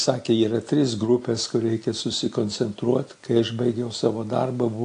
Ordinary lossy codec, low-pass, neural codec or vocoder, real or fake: AAC, 48 kbps; 9.9 kHz; none; real